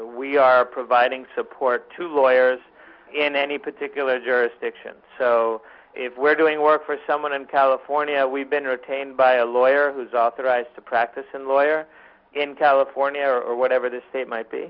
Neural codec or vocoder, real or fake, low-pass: none; real; 5.4 kHz